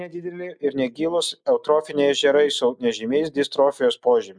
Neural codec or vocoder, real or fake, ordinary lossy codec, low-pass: none; real; MP3, 96 kbps; 9.9 kHz